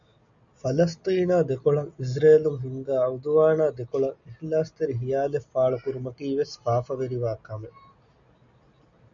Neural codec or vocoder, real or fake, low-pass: none; real; 7.2 kHz